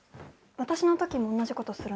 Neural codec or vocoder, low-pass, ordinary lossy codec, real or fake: none; none; none; real